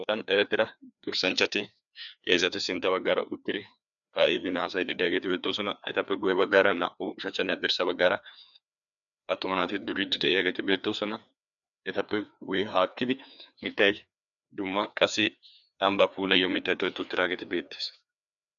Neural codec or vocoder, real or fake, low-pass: codec, 16 kHz, 2 kbps, FreqCodec, larger model; fake; 7.2 kHz